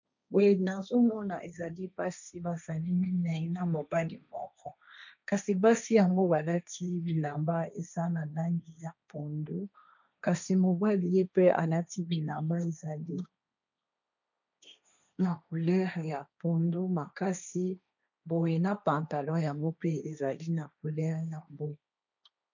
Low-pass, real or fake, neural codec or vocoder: 7.2 kHz; fake; codec, 16 kHz, 1.1 kbps, Voila-Tokenizer